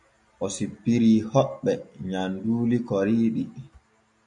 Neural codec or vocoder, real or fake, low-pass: none; real; 10.8 kHz